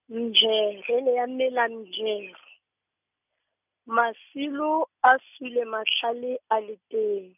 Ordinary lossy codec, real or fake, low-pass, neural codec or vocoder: none; real; 3.6 kHz; none